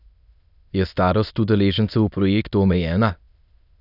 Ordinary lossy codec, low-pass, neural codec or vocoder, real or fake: none; 5.4 kHz; autoencoder, 22.05 kHz, a latent of 192 numbers a frame, VITS, trained on many speakers; fake